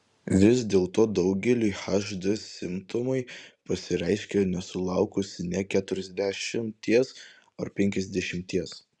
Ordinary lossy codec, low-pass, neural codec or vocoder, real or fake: Opus, 64 kbps; 10.8 kHz; vocoder, 44.1 kHz, 128 mel bands every 512 samples, BigVGAN v2; fake